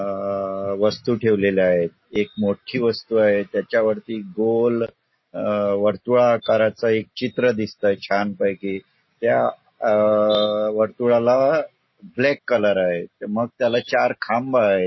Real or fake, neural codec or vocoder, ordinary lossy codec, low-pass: real; none; MP3, 24 kbps; 7.2 kHz